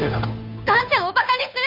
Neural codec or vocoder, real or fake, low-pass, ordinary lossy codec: codec, 16 kHz, 2 kbps, FunCodec, trained on Chinese and English, 25 frames a second; fake; 5.4 kHz; none